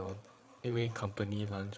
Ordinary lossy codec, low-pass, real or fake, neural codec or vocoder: none; none; fake; codec, 16 kHz, 8 kbps, FreqCodec, larger model